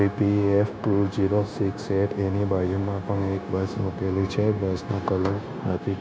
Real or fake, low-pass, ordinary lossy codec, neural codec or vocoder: fake; none; none; codec, 16 kHz, 0.9 kbps, LongCat-Audio-Codec